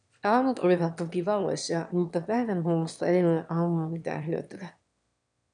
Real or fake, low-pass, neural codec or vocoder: fake; 9.9 kHz; autoencoder, 22.05 kHz, a latent of 192 numbers a frame, VITS, trained on one speaker